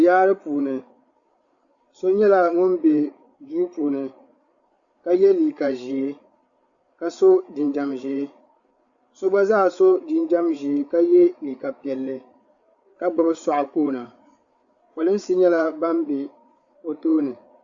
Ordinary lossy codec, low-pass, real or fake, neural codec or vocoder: Opus, 64 kbps; 7.2 kHz; fake; codec, 16 kHz, 8 kbps, FreqCodec, larger model